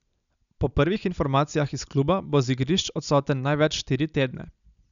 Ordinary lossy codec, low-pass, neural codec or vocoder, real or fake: none; 7.2 kHz; none; real